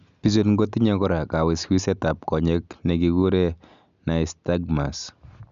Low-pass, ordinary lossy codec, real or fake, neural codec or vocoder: 7.2 kHz; none; real; none